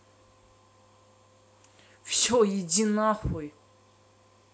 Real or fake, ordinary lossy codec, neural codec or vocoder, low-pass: real; none; none; none